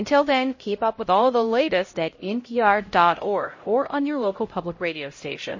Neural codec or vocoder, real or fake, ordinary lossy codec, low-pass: codec, 16 kHz, 0.5 kbps, X-Codec, HuBERT features, trained on LibriSpeech; fake; MP3, 32 kbps; 7.2 kHz